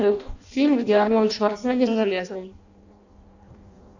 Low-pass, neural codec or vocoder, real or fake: 7.2 kHz; codec, 16 kHz in and 24 kHz out, 0.6 kbps, FireRedTTS-2 codec; fake